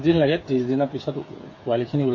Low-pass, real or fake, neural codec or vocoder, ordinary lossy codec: 7.2 kHz; fake; codec, 16 kHz, 8 kbps, FreqCodec, smaller model; MP3, 32 kbps